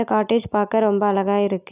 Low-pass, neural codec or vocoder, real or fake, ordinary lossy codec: 3.6 kHz; none; real; none